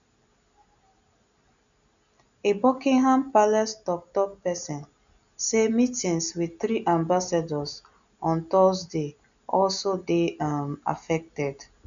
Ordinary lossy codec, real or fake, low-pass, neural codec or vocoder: MP3, 96 kbps; real; 7.2 kHz; none